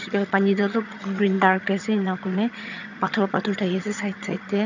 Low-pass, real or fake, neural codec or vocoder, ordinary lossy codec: 7.2 kHz; fake; vocoder, 22.05 kHz, 80 mel bands, HiFi-GAN; none